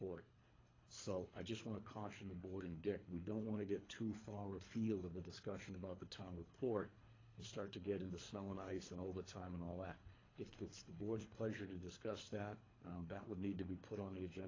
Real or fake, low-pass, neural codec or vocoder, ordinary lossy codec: fake; 7.2 kHz; codec, 24 kHz, 3 kbps, HILCodec; AAC, 32 kbps